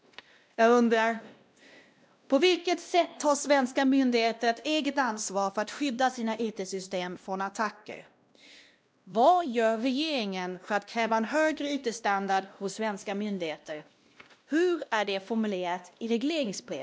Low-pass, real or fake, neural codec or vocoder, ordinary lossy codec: none; fake; codec, 16 kHz, 1 kbps, X-Codec, WavLM features, trained on Multilingual LibriSpeech; none